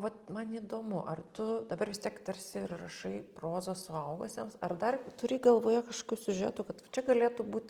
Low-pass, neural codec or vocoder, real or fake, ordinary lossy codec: 19.8 kHz; none; real; Opus, 24 kbps